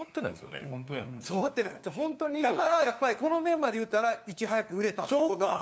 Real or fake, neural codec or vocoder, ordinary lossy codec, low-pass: fake; codec, 16 kHz, 2 kbps, FunCodec, trained on LibriTTS, 25 frames a second; none; none